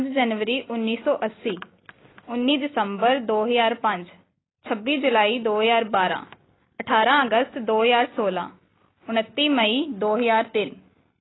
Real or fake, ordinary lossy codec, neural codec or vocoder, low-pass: real; AAC, 16 kbps; none; 7.2 kHz